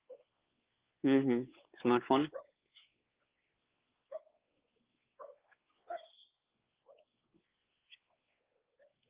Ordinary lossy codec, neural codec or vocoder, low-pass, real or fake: Opus, 16 kbps; codec, 16 kHz, 4 kbps, X-Codec, WavLM features, trained on Multilingual LibriSpeech; 3.6 kHz; fake